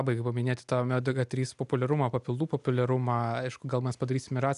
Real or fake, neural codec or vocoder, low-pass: real; none; 10.8 kHz